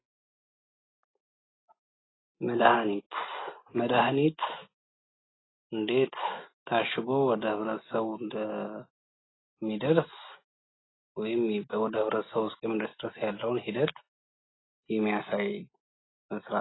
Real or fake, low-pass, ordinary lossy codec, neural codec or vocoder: real; 7.2 kHz; AAC, 16 kbps; none